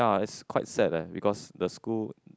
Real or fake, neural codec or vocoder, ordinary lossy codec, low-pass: real; none; none; none